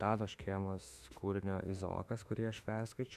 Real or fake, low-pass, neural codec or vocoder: fake; 14.4 kHz; autoencoder, 48 kHz, 32 numbers a frame, DAC-VAE, trained on Japanese speech